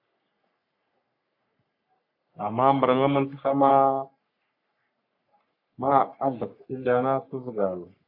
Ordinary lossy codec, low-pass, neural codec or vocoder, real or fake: AAC, 48 kbps; 5.4 kHz; codec, 44.1 kHz, 3.4 kbps, Pupu-Codec; fake